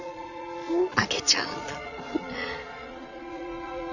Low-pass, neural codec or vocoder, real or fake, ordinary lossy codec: 7.2 kHz; vocoder, 22.05 kHz, 80 mel bands, Vocos; fake; none